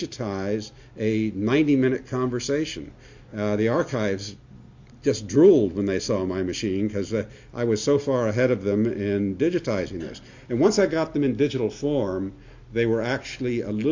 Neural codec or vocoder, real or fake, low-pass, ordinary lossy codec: none; real; 7.2 kHz; MP3, 48 kbps